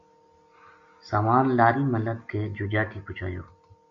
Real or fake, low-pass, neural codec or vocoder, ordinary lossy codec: real; 7.2 kHz; none; MP3, 48 kbps